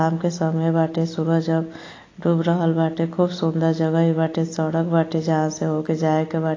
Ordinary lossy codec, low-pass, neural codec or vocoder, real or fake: AAC, 32 kbps; 7.2 kHz; none; real